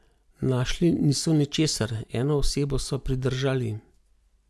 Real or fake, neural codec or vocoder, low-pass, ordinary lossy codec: real; none; none; none